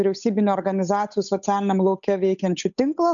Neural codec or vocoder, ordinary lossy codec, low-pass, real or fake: codec, 16 kHz, 8 kbps, FunCodec, trained on Chinese and English, 25 frames a second; MP3, 96 kbps; 7.2 kHz; fake